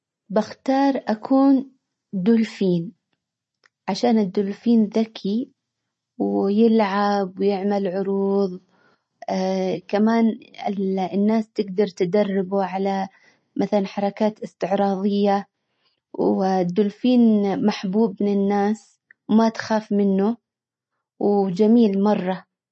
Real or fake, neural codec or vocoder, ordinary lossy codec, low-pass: real; none; MP3, 32 kbps; 10.8 kHz